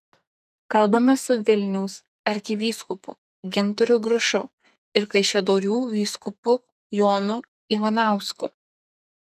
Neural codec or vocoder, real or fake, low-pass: codec, 44.1 kHz, 2.6 kbps, SNAC; fake; 14.4 kHz